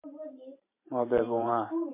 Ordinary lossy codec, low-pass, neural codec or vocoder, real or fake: MP3, 24 kbps; 3.6 kHz; vocoder, 44.1 kHz, 128 mel bands every 512 samples, BigVGAN v2; fake